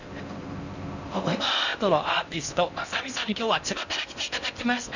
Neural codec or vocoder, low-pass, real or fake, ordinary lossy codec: codec, 16 kHz in and 24 kHz out, 0.6 kbps, FocalCodec, streaming, 4096 codes; 7.2 kHz; fake; none